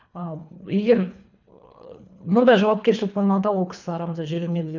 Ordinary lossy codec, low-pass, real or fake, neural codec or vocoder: none; 7.2 kHz; fake; codec, 24 kHz, 3 kbps, HILCodec